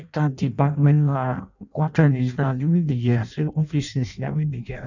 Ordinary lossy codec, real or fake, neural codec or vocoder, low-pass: none; fake; codec, 16 kHz in and 24 kHz out, 0.6 kbps, FireRedTTS-2 codec; 7.2 kHz